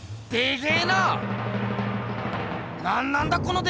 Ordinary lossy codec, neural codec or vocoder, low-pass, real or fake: none; none; none; real